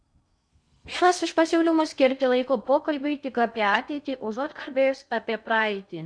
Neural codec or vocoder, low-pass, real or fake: codec, 16 kHz in and 24 kHz out, 0.6 kbps, FocalCodec, streaming, 2048 codes; 9.9 kHz; fake